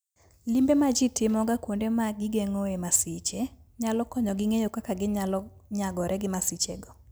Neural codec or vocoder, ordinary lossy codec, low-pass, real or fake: none; none; none; real